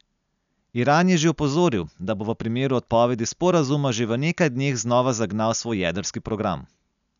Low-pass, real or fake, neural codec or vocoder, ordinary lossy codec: 7.2 kHz; real; none; none